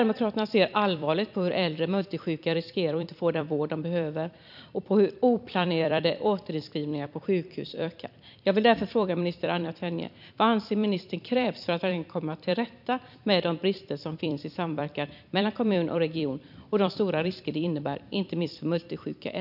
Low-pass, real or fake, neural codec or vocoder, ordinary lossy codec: 5.4 kHz; fake; vocoder, 44.1 kHz, 128 mel bands every 256 samples, BigVGAN v2; none